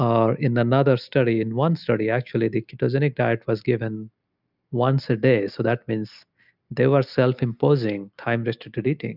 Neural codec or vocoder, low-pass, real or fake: none; 5.4 kHz; real